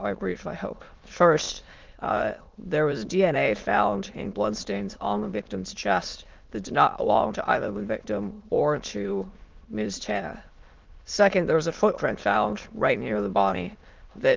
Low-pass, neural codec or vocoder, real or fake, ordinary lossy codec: 7.2 kHz; autoencoder, 22.05 kHz, a latent of 192 numbers a frame, VITS, trained on many speakers; fake; Opus, 32 kbps